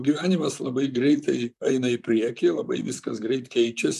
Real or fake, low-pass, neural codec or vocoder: fake; 14.4 kHz; vocoder, 44.1 kHz, 128 mel bands, Pupu-Vocoder